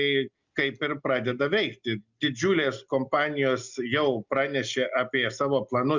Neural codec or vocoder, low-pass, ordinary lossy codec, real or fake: none; 7.2 kHz; Opus, 64 kbps; real